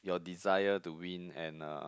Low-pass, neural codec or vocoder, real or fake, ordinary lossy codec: none; none; real; none